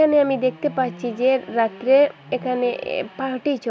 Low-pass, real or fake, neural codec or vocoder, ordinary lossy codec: none; real; none; none